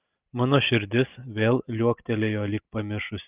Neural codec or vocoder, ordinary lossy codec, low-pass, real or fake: none; Opus, 24 kbps; 3.6 kHz; real